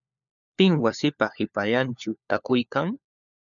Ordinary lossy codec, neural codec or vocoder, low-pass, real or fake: MP3, 96 kbps; codec, 16 kHz, 4 kbps, FunCodec, trained on LibriTTS, 50 frames a second; 7.2 kHz; fake